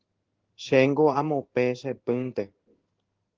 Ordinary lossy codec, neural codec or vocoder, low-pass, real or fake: Opus, 16 kbps; codec, 16 kHz in and 24 kHz out, 1 kbps, XY-Tokenizer; 7.2 kHz; fake